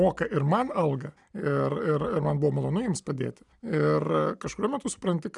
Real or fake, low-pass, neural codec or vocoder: real; 10.8 kHz; none